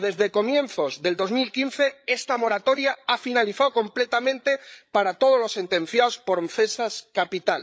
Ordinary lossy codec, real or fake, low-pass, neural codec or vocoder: none; fake; none; codec, 16 kHz, 8 kbps, FreqCodec, larger model